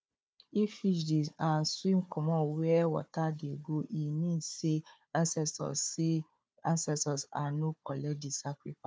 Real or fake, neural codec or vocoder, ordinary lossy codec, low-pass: fake; codec, 16 kHz, 4 kbps, FunCodec, trained on Chinese and English, 50 frames a second; none; none